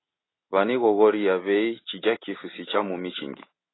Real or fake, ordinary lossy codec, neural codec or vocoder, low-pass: real; AAC, 16 kbps; none; 7.2 kHz